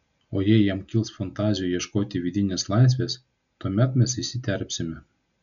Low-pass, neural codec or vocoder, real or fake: 7.2 kHz; none; real